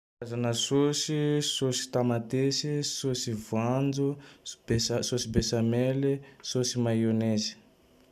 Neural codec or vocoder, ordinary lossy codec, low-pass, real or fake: none; none; 14.4 kHz; real